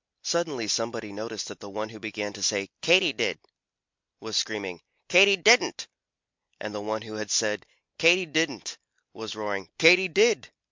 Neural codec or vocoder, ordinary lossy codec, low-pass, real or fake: none; MP3, 64 kbps; 7.2 kHz; real